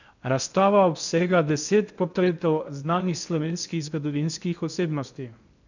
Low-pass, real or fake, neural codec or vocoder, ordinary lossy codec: 7.2 kHz; fake; codec, 16 kHz in and 24 kHz out, 0.6 kbps, FocalCodec, streaming, 2048 codes; none